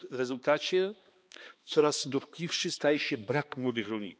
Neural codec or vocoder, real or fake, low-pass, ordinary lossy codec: codec, 16 kHz, 2 kbps, X-Codec, HuBERT features, trained on balanced general audio; fake; none; none